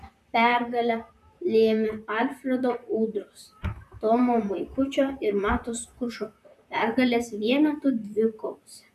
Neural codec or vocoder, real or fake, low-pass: vocoder, 44.1 kHz, 128 mel bands, Pupu-Vocoder; fake; 14.4 kHz